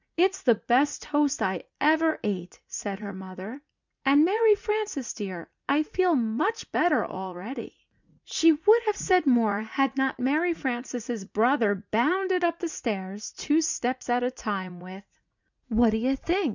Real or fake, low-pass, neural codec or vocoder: fake; 7.2 kHz; vocoder, 44.1 kHz, 80 mel bands, Vocos